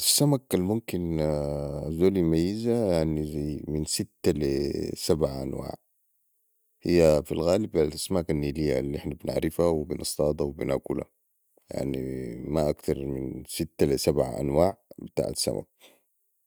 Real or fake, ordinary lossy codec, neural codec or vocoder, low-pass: real; none; none; none